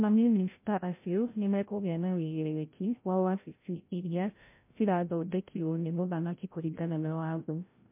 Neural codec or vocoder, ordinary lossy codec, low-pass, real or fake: codec, 16 kHz, 0.5 kbps, FreqCodec, larger model; MP3, 24 kbps; 3.6 kHz; fake